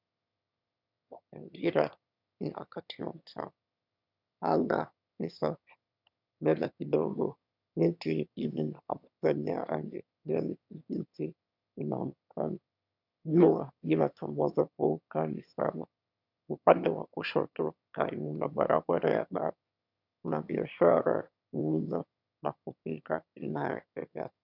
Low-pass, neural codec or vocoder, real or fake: 5.4 kHz; autoencoder, 22.05 kHz, a latent of 192 numbers a frame, VITS, trained on one speaker; fake